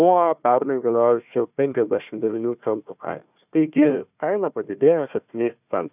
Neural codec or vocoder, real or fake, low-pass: codec, 16 kHz, 1 kbps, FunCodec, trained on Chinese and English, 50 frames a second; fake; 3.6 kHz